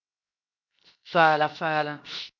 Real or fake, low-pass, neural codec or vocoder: fake; 7.2 kHz; codec, 16 kHz, 0.7 kbps, FocalCodec